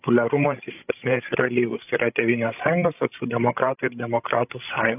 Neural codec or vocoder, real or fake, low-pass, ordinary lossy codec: codec, 16 kHz, 16 kbps, FreqCodec, larger model; fake; 3.6 kHz; AAC, 32 kbps